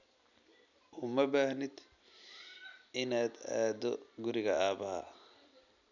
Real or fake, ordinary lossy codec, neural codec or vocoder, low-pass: real; none; none; 7.2 kHz